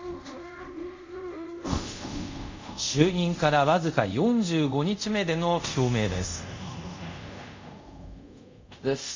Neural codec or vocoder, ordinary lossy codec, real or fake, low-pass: codec, 24 kHz, 0.5 kbps, DualCodec; MP3, 64 kbps; fake; 7.2 kHz